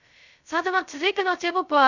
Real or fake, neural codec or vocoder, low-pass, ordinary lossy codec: fake; codec, 16 kHz, 0.2 kbps, FocalCodec; 7.2 kHz; none